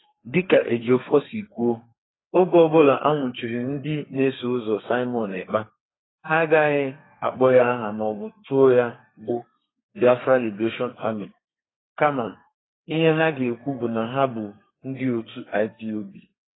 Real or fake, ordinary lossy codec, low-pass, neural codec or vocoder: fake; AAC, 16 kbps; 7.2 kHz; codec, 32 kHz, 1.9 kbps, SNAC